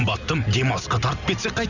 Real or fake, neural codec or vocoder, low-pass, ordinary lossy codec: real; none; 7.2 kHz; none